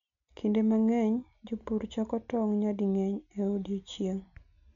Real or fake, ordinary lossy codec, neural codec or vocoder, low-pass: real; MP3, 64 kbps; none; 7.2 kHz